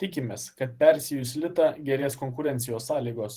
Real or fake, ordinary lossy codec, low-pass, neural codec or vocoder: fake; Opus, 16 kbps; 14.4 kHz; vocoder, 44.1 kHz, 128 mel bands every 512 samples, BigVGAN v2